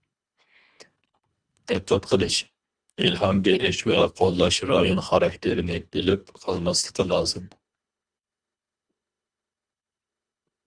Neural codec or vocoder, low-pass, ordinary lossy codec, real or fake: codec, 24 kHz, 1.5 kbps, HILCodec; 9.9 kHz; Opus, 64 kbps; fake